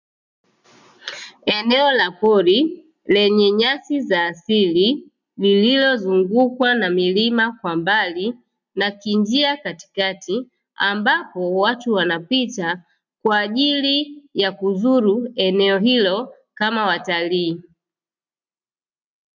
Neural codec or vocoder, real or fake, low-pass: none; real; 7.2 kHz